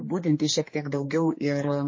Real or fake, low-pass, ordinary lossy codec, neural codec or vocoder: fake; 7.2 kHz; MP3, 32 kbps; codec, 16 kHz, 2 kbps, X-Codec, HuBERT features, trained on general audio